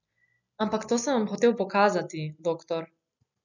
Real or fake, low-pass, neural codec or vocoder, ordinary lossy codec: real; 7.2 kHz; none; none